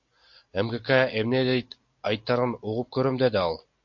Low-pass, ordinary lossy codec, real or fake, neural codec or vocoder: 7.2 kHz; MP3, 48 kbps; real; none